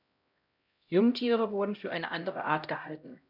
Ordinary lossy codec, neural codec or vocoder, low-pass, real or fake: none; codec, 16 kHz, 0.5 kbps, X-Codec, HuBERT features, trained on LibriSpeech; 5.4 kHz; fake